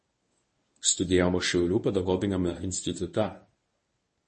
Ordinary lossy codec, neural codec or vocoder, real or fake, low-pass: MP3, 32 kbps; codec, 24 kHz, 0.9 kbps, WavTokenizer, medium speech release version 1; fake; 10.8 kHz